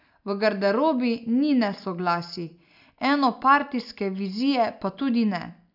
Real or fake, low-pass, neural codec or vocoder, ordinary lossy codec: real; 5.4 kHz; none; none